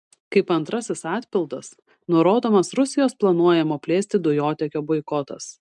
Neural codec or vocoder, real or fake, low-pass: none; real; 10.8 kHz